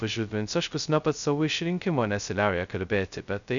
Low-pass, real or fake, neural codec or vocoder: 7.2 kHz; fake; codec, 16 kHz, 0.2 kbps, FocalCodec